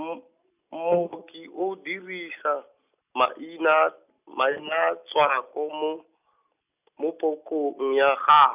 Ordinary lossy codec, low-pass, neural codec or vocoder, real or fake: none; 3.6 kHz; none; real